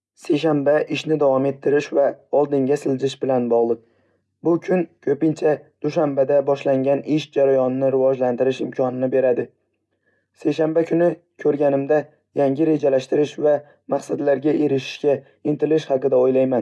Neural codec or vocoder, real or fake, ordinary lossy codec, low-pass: none; real; none; none